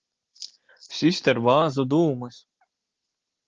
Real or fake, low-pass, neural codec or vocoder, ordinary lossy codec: real; 7.2 kHz; none; Opus, 16 kbps